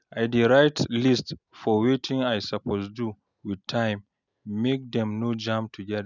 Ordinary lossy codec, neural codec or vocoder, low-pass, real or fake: none; none; 7.2 kHz; real